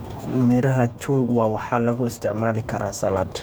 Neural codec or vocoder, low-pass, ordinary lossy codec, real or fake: codec, 44.1 kHz, 2.6 kbps, DAC; none; none; fake